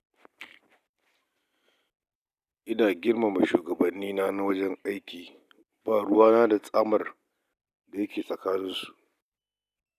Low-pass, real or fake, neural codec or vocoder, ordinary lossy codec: 14.4 kHz; real; none; none